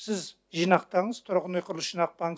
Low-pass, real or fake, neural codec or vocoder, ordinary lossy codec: none; real; none; none